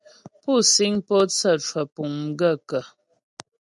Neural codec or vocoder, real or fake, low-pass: none; real; 10.8 kHz